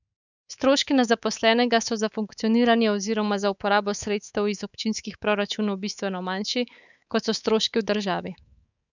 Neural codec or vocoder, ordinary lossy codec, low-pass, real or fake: codec, 24 kHz, 3.1 kbps, DualCodec; none; 7.2 kHz; fake